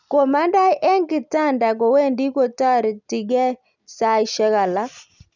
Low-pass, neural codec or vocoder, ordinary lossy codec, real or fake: 7.2 kHz; none; none; real